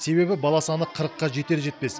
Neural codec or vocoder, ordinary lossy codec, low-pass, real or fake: none; none; none; real